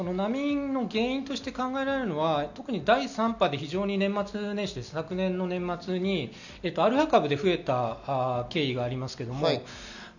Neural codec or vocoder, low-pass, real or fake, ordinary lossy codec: none; 7.2 kHz; real; none